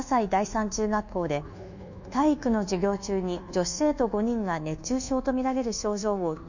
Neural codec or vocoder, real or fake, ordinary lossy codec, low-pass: codec, 24 kHz, 1.2 kbps, DualCodec; fake; none; 7.2 kHz